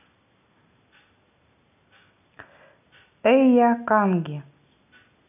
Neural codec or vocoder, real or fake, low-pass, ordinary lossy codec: none; real; 3.6 kHz; none